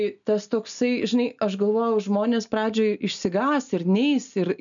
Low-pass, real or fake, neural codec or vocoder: 7.2 kHz; real; none